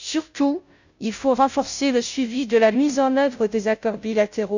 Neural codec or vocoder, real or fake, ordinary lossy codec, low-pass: codec, 16 kHz, 0.5 kbps, FunCodec, trained on Chinese and English, 25 frames a second; fake; none; 7.2 kHz